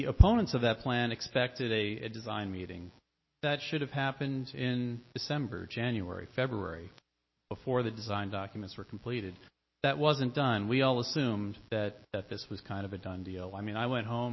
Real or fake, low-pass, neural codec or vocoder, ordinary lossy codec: real; 7.2 kHz; none; MP3, 24 kbps